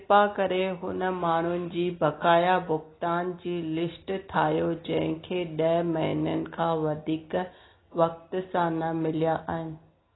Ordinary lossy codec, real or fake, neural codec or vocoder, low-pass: AAC, 16 kbps; real; none; 7.2 kHz